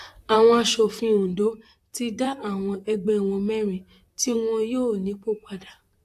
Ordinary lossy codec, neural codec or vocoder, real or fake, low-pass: AAC, 64 kbps; vocoder, 44.1 kHz, 128 mel bands, Pupu-Vocoder; fake; 14.4 kHz